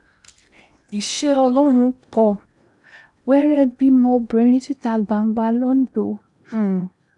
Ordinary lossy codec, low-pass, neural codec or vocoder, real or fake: none; 10.8 kHz; codec, 16 kHz in and 24 kHz out, 0.8 kbps, FocalCodec, streaming, 65536 codes; fake